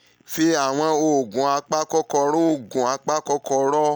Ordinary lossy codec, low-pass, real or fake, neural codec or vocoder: none; none; real; none